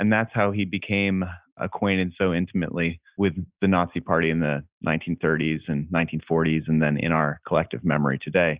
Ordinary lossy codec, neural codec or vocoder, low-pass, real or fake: Opus, 64 kbps; none; 3.6 kHz; real